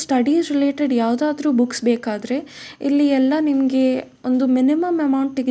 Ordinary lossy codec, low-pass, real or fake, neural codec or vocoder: none; none; real; none